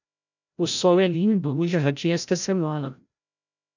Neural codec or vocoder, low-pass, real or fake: codec, 16 kHz, 0.5 kbps, FreqCodec, larger model; 7.2 kHz; fake